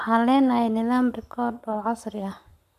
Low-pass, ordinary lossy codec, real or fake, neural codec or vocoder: 14.4 kHz; none; fake; codec, 44.1 kHz, 7.8 kbps, Pupu-Codec